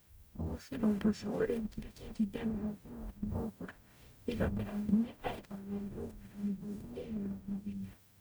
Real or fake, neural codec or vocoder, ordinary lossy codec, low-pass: fake; codec, 44.1 kHz, 0.9 kbps, DAC; none; none